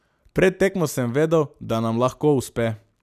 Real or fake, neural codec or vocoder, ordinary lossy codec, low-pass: real; none; none; 14.4 kHz